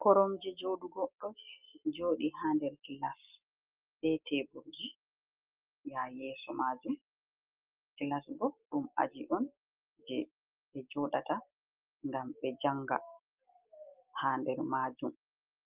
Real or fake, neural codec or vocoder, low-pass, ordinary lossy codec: real; none; 3.6 kHz; Opus, 24 kbps